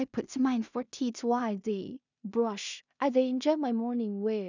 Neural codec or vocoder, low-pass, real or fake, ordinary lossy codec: codec, 16 kHz in and 24 kHz out, 0.4 kbps, LongCat-Audio-Codec, two codebook decoder; 7.2 kHz; fake; none